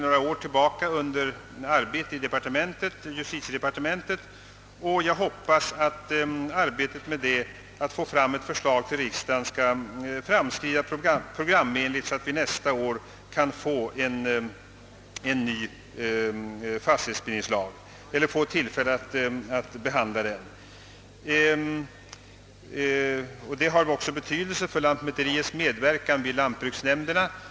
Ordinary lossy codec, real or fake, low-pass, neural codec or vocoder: none; real; none; none